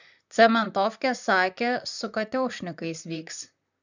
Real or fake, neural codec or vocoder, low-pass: fake; vocoder, 44.1 kHz, 128 mel bands, Pupu-Vocoder; 7.2 kHz